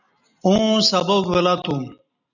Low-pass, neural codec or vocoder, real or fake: 7.2 kHz; none; real